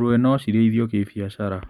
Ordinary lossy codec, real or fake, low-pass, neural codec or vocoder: none; real; 19.8 kHz; none